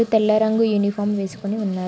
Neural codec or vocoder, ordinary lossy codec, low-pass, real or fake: none; none; none; real